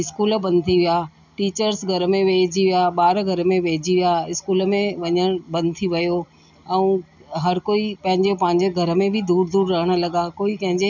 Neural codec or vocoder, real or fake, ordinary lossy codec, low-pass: none; real; none; 7.2 kHz